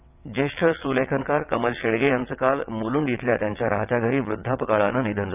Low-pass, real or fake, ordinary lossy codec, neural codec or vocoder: 3.6 kHz; fake; none; vocoder, 22.05 kHz, 80 mel bands, WaveNeXt